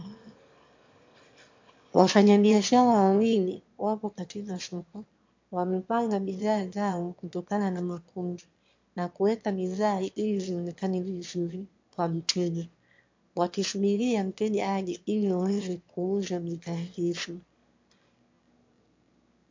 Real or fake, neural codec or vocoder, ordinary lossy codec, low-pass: fake; autoencoder, 22.05 kHz, a latent of 192 numbers a frame, VITS, trained on one speaker; MP3, 48 kbps; 7.2 kHz